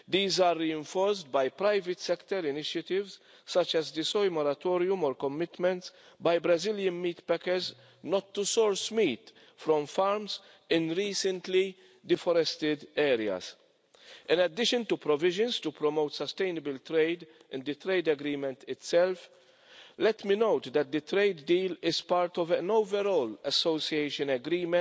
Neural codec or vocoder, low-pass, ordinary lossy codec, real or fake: none; none; none; real